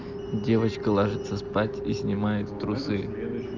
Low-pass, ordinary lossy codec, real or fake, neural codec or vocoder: 7.2 kHz; Opus, 32 kbps; real; none